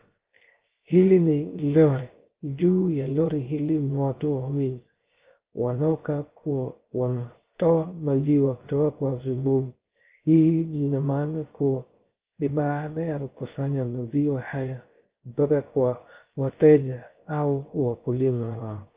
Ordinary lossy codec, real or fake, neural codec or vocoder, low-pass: Opus, 32 kbps; fake; codec, 16 kHz, 0.3 kbps, FocalCodec; 3.6 kHz